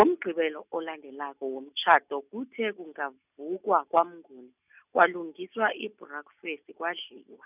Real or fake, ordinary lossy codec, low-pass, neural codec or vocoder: real; none; 3.6 kHz; none